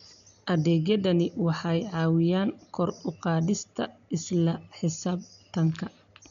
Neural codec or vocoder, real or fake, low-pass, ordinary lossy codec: none; real; 7.2 kHz; none